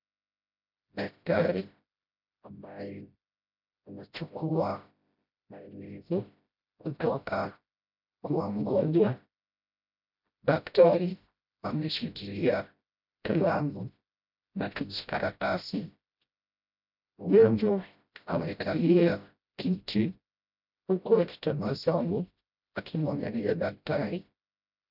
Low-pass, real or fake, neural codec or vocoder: 5.4 kHz; fake; codec, 16 kHz, 0.5 kbps, FreqCodec, smaller model